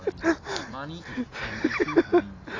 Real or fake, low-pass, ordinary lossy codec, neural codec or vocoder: real; 7.2 kHz; AAC, 32 kbps; none